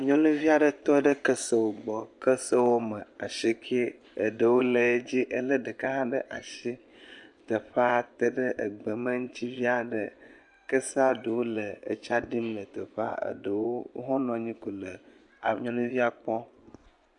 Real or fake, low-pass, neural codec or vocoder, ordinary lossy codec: fake; 10.8 kHz; vocoder, 24 kHz, 100 mel bands, Vocos; MP3, 96 kbps